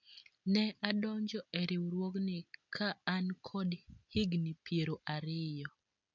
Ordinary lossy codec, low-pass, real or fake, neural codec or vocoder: none; 7.2 kHz; real; none